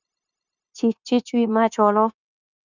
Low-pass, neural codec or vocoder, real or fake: 7.2 kHz; codec, 16 kHz, 0.9 kbps, LongCat-Audio-Codec; fake